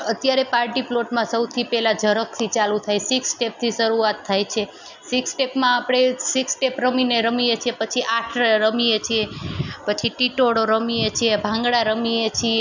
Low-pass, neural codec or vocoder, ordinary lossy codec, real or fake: 7.2 kHz; none; none; real